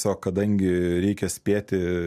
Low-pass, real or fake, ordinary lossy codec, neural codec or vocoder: 14.4 kHz; real; MP3, 96 kbps; none